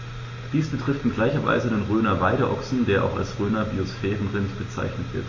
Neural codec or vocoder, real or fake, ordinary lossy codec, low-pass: none; real; MP3, 32 kbps; 7.2 kHz